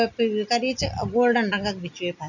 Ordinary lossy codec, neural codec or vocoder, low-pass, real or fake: none; none; 7.2 kHz; real